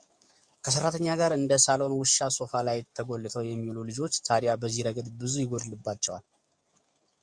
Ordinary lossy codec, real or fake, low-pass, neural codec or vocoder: Opus, 64 kbps; fake; 9.9 kHz; codec, 44.1 kHz, 7.8 kbps, DAC